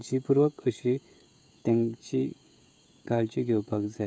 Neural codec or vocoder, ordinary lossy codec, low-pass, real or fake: codec, 16 kHz, 16 kbps, FreqCodec, smaller model; none; none; fake